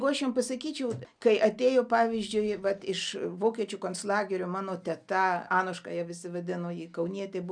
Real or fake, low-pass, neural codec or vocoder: real; 9.9 kHz; none